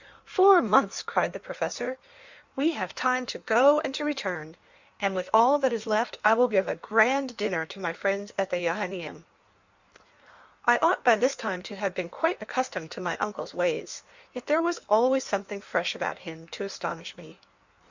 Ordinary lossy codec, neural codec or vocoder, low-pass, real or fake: Opus, 64 kbps; codec, 16 kHz in and 24 kHz out, 1.1 kbps, FireRedTTS-2 codec; 7.2 kHz; fake